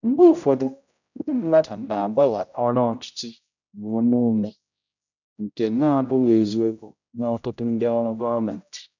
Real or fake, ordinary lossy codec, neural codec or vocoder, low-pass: fake; none; codec, 16 kHz, 0.5 kbps, X-Codec, HuBERT features, trained on general audio; 7.2 kHz